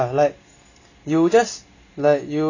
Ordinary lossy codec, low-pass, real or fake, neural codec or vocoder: none; 7.2 kHz; real; none